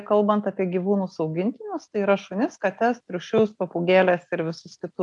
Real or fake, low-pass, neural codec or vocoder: real; 10.8 kHz; none